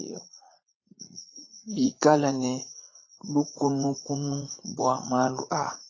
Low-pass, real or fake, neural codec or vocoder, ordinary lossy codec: 7.2 kHz; fake; vocoder, 44.1 kHz, 80 mel bands, Vocos; AAC, 32 kbps